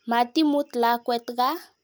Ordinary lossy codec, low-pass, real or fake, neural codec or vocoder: none; none; real; none